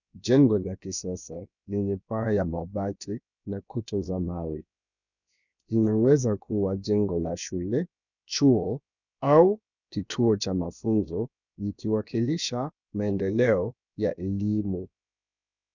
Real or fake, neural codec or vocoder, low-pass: fake; codec, 16 kHz, about 1 kbps, DyCAST, with the encoder's durations; 7.2 kHz